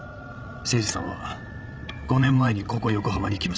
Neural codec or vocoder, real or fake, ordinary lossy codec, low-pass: codec, 16 kHz, 16 kbps, FreqCodec, larger model; fake; none; none